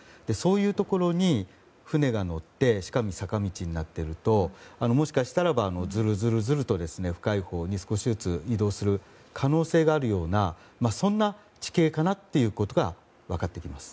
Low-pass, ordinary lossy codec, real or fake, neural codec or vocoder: none; none; real; none